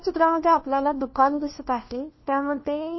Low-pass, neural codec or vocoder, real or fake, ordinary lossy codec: 7.2 kHz; codec, 16 kHz, 1 kbps, FunCodec, trained on LibriTTS, 50 frames a second; fake; MP3, 24 kbps